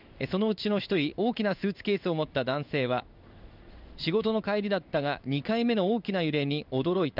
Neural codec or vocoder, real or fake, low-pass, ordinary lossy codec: codec, 16 kHz in and 24 kHz out, 1 kbps, XY-Tokenizer; fake; 5.4 kHz; none